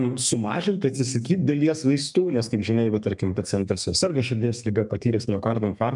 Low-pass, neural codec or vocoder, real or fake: 10.8 kHz; codec, 32 kHz, 1.9 kbps, SNAC; fake